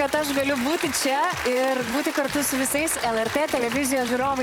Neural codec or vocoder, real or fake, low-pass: vocoder, 44.1 kHz, 128 mel bands, Pupu-Vocoder; fake; 19.8 kHz